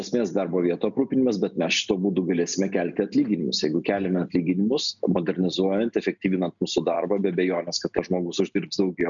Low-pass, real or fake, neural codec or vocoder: 7.2 kHz; real; none